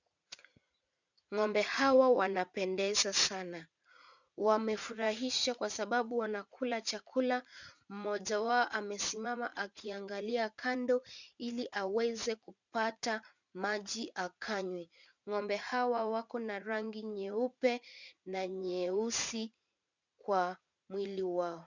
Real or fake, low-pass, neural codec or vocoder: fake; 7.2 kHz; vocoder, 44.1 kHz, 80 mel bands, Vocos